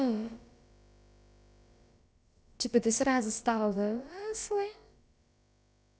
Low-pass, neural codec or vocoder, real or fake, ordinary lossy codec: none; codec, 16 kHz, about 1 kbps, DyCAST, with the encoder's durations; fake; none